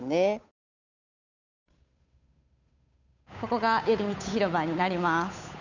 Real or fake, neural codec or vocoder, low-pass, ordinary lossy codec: fake; codec, 16 kHz, 8 kbps, FunCodec, trained on Chinese and English, 25 frames a second; 7.2 kHz; none